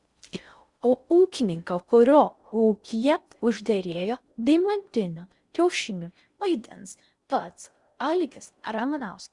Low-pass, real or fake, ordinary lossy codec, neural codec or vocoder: 10.8 kHz; fake; Opus, 64 kbps; codec, 16 kHz in and 24 kHz out, 0.6 kbps, FocalCodec, streaming, 4096 codes